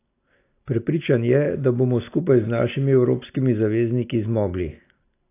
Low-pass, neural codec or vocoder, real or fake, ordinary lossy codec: 3.6 kHz; none; real; AAC, 24 kbps